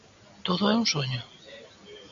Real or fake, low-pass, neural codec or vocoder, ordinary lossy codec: real; 7.2 kHz; none; MP3, 64 kbps